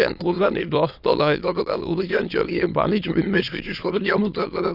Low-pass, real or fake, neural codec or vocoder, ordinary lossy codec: 5.4 kHz; fake; autoencoder, 22.05 kHz, a latent of 192 numbers a frame, VITS, trained on many speakers; none